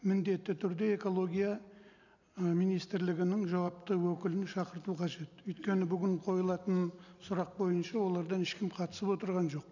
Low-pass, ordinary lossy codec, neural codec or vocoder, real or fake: 7.2 kHz; none; none; real